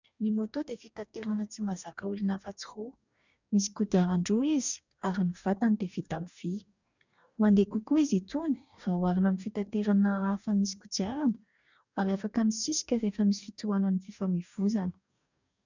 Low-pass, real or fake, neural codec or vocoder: 7.2 kHz; fake; codec, 44.1 kHz, 2.6 kbps, DAC